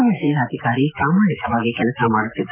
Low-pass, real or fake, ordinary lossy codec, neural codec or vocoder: 3.6 kHz; fake; none; autoencoder, 48 kHz, 128 numbers a frame, DAC-VAE, trained on Japanese speech